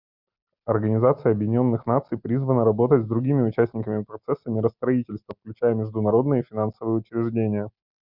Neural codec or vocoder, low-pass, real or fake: none; 5.4 kHz; real